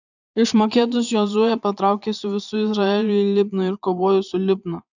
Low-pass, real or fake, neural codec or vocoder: 7.2 kHz; fake; vocoder, 22.05 kHz, 80 mel bands, Vocos